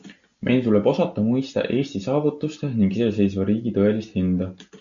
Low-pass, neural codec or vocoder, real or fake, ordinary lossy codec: 7.2 kHz; none; real; MP3, 64 kbps